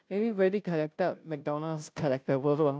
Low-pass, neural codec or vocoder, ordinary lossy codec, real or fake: none; codec, 16 kHz, 0.5 kbps, FunCodec, trained on Chinese and English, 25 frames a second; none; fake